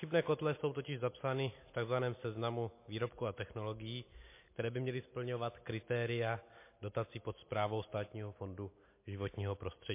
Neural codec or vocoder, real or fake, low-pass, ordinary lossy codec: none; real; 3.6 kHz; MP3, 24 kbps